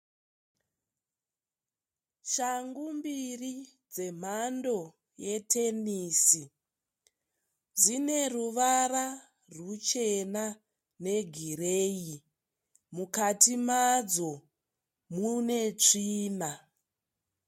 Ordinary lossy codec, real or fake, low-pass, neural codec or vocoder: MP3, 64 kbps; real; 19.8 kHz; none